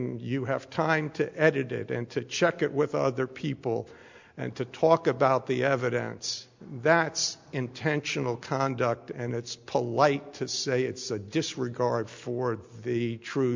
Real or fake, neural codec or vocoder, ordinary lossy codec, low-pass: real; none; MP3, 64 kbps; 7.2 kHz